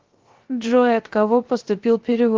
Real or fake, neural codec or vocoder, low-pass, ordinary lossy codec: fake; codec, 16 kHz, 0.3 kbps, FocalCodec; 7.2 kHz; Opus, 16 kbps